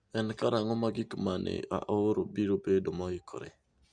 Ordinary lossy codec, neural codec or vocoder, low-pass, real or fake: Opus, 64 kbps; none; 9.9 kHz; real